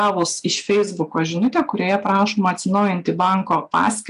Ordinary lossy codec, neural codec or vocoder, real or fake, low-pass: AAC, 96 kbps; none; real; 10.8 kHz